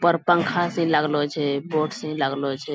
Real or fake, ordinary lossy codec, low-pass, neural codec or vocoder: real; none; none; none